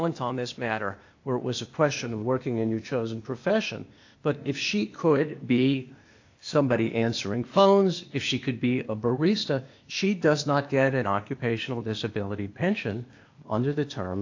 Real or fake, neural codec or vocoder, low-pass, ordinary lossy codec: fake; codec, 16 kHz, 0.8 kbps, ZipCodec; 7.2 kHz; AAC, 48 kbps